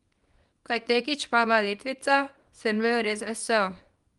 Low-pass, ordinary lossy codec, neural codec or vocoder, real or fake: 10.8 kHz; Opus, 32 kbps; codec, 24 kHz, 0.9 kbps, WavTokenizer, small release; fake